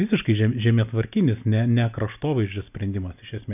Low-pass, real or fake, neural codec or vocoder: 3.6 kHz; real; none